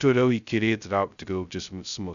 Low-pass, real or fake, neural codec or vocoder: 7.2 kHz; fake; codec, 16 kHz, 0.2 kbps, FocalCodec